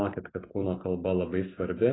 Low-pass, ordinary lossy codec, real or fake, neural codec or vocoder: 7.2 kHz; AAC, 16 kbps; real; none